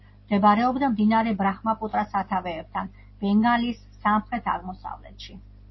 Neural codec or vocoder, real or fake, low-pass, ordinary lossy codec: none; real; 7.2 kHz; MP3, 24 kbps